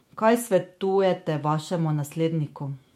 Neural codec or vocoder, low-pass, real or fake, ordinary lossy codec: autoencoder, 48 kHz, 128 numbers a frame, DAC-VAE, trained on Japanese speech; 19.8 kHz; fake; MP3, 64 kbps